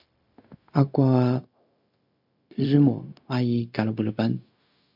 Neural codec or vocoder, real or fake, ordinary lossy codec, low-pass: codec, 16 kHz, 0.4 kbps, LongCat-Audio-Codec; fake; MP3, 48 kbps; 5.4 kHz